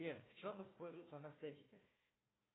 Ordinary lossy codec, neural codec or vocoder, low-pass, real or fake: AAC, 16 kbps; codec, 16 kHz, 1 kbps, FunCodec, trained on Chinese and English, 50 frames a second; 7.2 kHz; fake